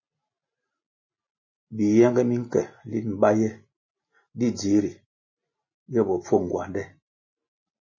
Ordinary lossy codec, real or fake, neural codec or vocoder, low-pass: MP3, 32 kbps; real; none; 7.2 kHz